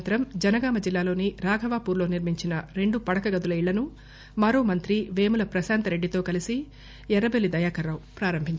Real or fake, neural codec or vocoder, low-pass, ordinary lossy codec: real; none; none; none